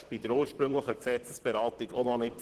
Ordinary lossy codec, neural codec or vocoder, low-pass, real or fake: Opus, 16 kbps; vocoder, 44.1 kHz, 128 mel bands, Pupu-Vocoder; 14.4 kHz; fake